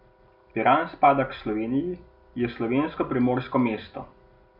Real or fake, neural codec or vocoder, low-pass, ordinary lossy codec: real; none; 5.4 kHz; none